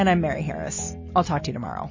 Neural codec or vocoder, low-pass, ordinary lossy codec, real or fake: none; 7.2 kHz; MP3, 32 kbps; real